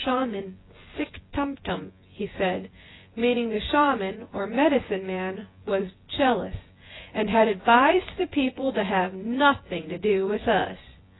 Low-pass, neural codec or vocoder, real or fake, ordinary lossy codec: 7.2 kHz; vocoder, 24 kHz, 100 mel bands, Vocos; fake; AAC, 16 kbps